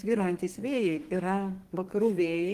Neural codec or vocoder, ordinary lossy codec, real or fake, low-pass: codec, 32 kHz, 1.9 kbps, SNAC; Opus, 24 kbps; fake; 14.4 kHz